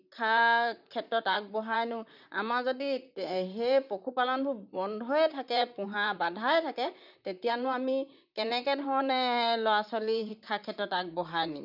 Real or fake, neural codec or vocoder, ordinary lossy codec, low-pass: fake; vocoder, 44.1 kHz, 128 mel bands, Pupu-Vocoder; none; 5.4 kHz